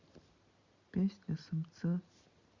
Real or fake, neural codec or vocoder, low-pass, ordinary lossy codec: real; none; 7.2 kHz; Opus, 64 kbps